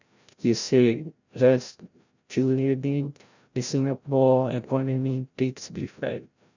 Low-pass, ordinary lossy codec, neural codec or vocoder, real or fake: 7.2 kHz; none; codec, 16 kHz, 0.5 kbps, FreqCodec, larger model; fake